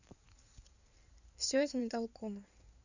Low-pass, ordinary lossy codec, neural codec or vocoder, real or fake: 7.2 kHz; none; codec, 16 kHz in and 24 kHz out, 2.2 kbps, FireRedTTS-2 codec; fake